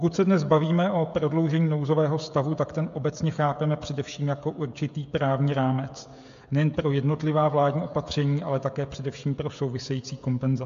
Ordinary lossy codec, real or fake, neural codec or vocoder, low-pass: AAC, 64 kbps; fake; codec, 16 kHz, 16 kbps, FreqCodec, smaller model; 7.2 kHz